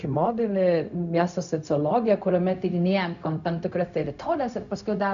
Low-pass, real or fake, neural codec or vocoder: 7.2 kHz; fake; codec, 16 kHz, 0.4 kbps, LongCat-Audio-Codec